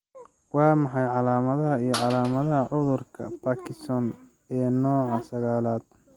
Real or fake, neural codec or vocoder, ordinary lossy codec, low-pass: real; none; Opus, 24 kbps; 19.8 kHz